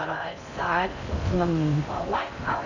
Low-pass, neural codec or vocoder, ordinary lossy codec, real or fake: 7.2 kHz; codec, 16 kHz in and 24 kHz out, 0.6 kbps, FocalCodec, streaming, 4096 codes; none; fake